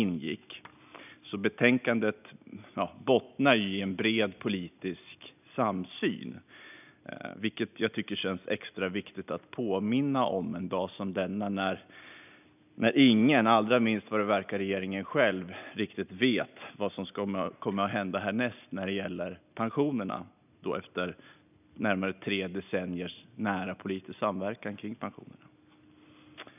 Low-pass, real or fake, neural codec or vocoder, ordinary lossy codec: 3.6 kHz; real; none; none